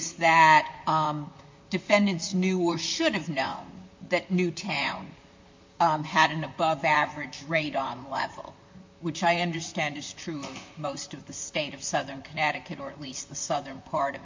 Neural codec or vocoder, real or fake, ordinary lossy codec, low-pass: vocoder, 44.1 kHz, 128 mel bands, Pupu-Vocoder; fake; MP3, 48 kbps; 7.2 kHz